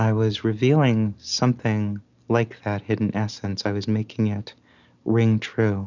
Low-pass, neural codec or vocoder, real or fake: 7.2 kHz; none; real